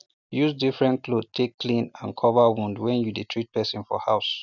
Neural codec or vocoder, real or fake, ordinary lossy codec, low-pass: none; real; none; 7.2 kHz